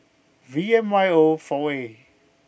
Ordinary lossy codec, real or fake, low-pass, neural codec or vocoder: none; real; none; none